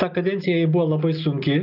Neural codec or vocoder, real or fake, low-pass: none; real; 5.4 kHz